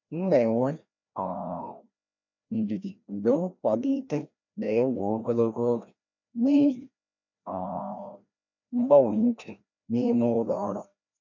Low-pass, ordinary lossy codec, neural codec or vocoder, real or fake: 7.2 kHz; none; codec, 16 kHz, 1 kbps, FreqCodec, larger model; fake